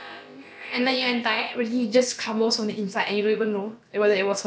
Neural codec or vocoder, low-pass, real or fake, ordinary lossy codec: codec, 16 kHz, about 1 kbps, DyCAST, with the encoder's durations; none; fake; none